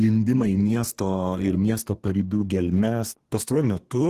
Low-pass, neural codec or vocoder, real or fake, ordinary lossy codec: 14.4 kHz; codec, 32 kHz, 1.9 kbps, SNAC; fake; Opus, 16 kbps